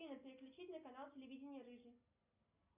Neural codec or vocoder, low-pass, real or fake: none; 3.6 kHz; real